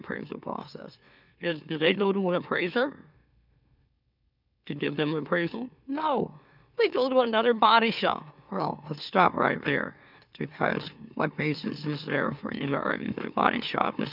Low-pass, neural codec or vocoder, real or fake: 5.4 kHz; autoencoder, 44.1 kHz, a latent of 192 numbers a frame, MeloTTS; fake